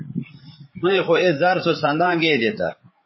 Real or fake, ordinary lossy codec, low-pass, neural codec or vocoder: fake; MP3, 24 kbps; 7.2 kHz; codec, 16 kHz, 8 kbps, FreqCodec, larger model